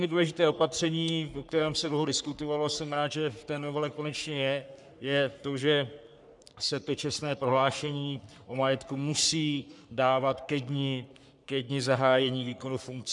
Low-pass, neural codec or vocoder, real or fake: 10.8 kHz; codec, 44.1 kHz, 3.4 kbps, Pupu-Codec; fake